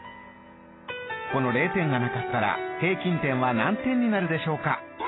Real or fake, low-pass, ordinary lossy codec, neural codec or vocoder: real; 7.2 kHz; AAC, 16 kbps; none